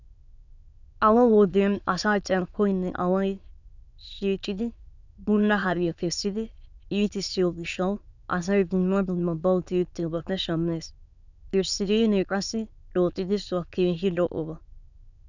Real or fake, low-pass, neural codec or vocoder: fake; 7.2 kHz; autoencoder, 22.05 kHz, a latent of 192 numbers a frame, VITS, trained on many speakers